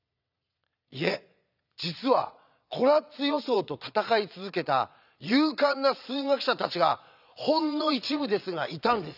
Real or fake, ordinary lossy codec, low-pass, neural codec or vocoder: fake; none; 5.4 kHz; vocoder, 22.05 kHz, 80 mel bands, Vocos